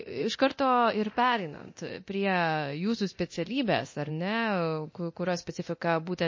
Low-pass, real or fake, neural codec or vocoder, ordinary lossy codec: 7.2 kHz; fake; codec, 24 kHz, 0.9 kbps, DualCodec; MP3, 32 kbps